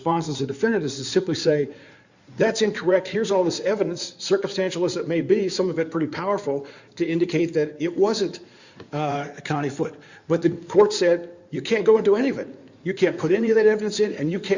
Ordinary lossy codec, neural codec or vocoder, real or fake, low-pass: Opus, 64 kbps; codec, 16 kHz in and 24 kHz out, 2.2 kbps, FireRedTTS-2 codec; fake; 7.2 kHz